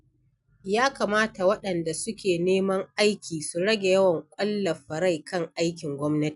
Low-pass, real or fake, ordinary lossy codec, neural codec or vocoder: 10.8 kHz; real; none; none